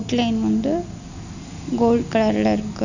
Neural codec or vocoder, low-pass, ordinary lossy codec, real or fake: none; 7.2 kHz; none; real